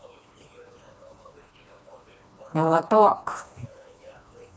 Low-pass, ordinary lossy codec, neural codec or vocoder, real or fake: none; none; codec, 16 kHz, 2 kbps, FreqCodec, smaller model; fake